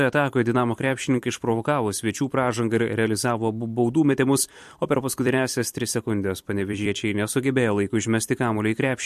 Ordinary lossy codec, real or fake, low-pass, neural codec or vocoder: MP3, 64 kbps; fake; 14.4 kHz; vocoder, 44.1 kHz, 128 mel bands every 256 samples, BigVGAN v2